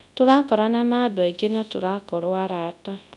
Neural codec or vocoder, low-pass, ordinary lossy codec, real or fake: codec, 24 kHz, 0.9 kbps, WavTokenizer, large speech release; 10.8 kHz; none; fake